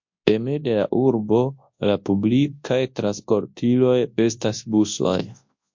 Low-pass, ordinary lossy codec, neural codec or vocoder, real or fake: 7.2 kHz; MP3, 48 kbps; codec, 24 kHz, 0.9 kbps, WavTokenizer, large speech release; fake